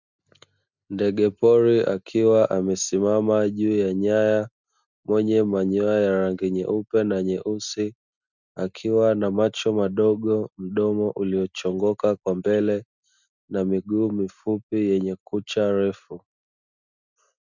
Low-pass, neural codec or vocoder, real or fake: 7.2 kHz; none; real